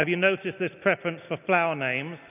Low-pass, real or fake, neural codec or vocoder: 3.6 kHz; real; none